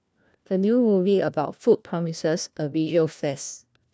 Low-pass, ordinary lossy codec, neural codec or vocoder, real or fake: none; none; codec, 16 kHz, 1 kbps, FunCodec, trained on LibriTTS, 50 frames a second; fake